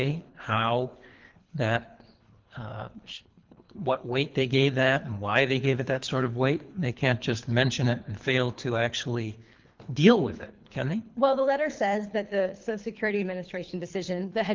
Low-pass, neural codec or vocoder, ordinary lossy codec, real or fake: 7.2 kHz; codec, 24 kHz, 3 kbps, HILCodec; Opus, 32 kbps; fake